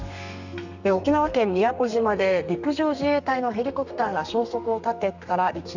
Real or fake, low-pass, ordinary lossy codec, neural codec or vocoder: fake; 7.2 kHz; none; codec, 32 kHz, 1.9 kbps, SNAC